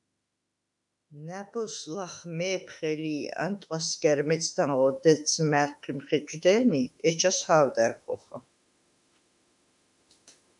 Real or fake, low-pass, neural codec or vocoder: fake; 10.8 kHz; autoencoder, 48 kHz, 32 numbers a frame, DAC-VAE, trained on Japanese speech